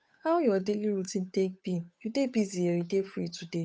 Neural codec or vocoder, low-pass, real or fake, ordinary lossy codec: codec, 16 kHz, 8 kbps, FunCodec, trained on Chinese and English, 25 frames a second; none; fake; none